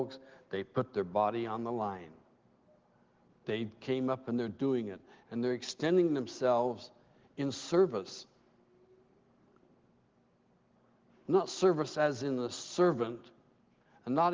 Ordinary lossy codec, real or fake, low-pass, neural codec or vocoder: Opus, 16 kbps; real; 7.2 kHz; none